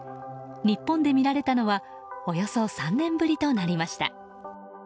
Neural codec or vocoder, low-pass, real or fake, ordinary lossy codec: none; none; real; none